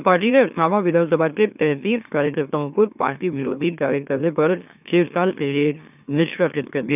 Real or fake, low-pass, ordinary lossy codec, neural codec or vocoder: fake; 3.6 kHz; none; autoencoder, 44.1 kHz, a latent of 192 numbers a frame, MeloTTS